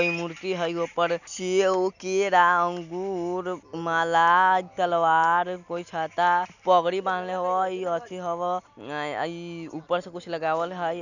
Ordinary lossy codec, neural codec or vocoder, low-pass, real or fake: none; none; 7.2 kHz; real